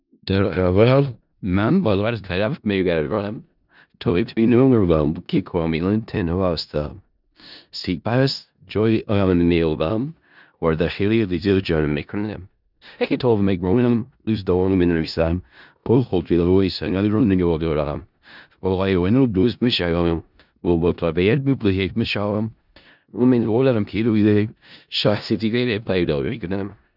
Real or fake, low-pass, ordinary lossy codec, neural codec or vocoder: fake; 5.4 kHz; none; codec, 16 kHz in and 24 kHz out, 0.4 kbps, LongCat-Audio-Codec, four codebook decoder